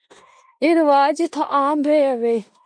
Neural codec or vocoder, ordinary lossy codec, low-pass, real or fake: codec, 16 kHz in and 24 kHz out, 0.9 kbps, LongCat-Audio-Codec, four codebook decoder; MP3, 48 kbps; 9.9 kHz; fake